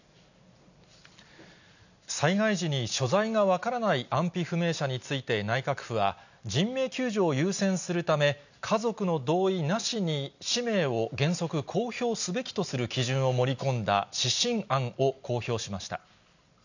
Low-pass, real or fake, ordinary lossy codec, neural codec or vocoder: 7.2 kHz; real; none; none